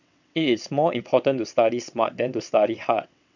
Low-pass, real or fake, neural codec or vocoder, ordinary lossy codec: 7.2 kHz; fake; vocoder, 22.05 kHz, 80 mel bands, WaveNeXt; none